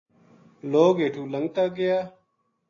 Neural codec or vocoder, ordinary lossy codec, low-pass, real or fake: none; AAC, 32 kbps; 7.2 kHz; real